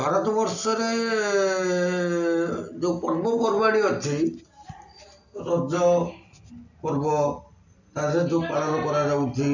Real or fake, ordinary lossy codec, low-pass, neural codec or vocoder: real; none; 7.2 kHz; none